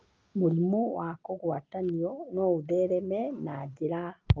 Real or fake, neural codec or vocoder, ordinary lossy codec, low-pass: real; none; Opus, 24 kbps; 7.2 kHz